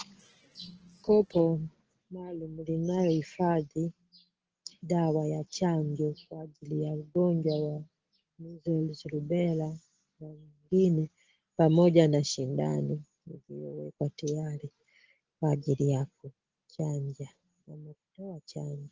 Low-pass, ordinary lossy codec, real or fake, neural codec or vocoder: 7.2 kHz; Opus, 16 kbps; real; none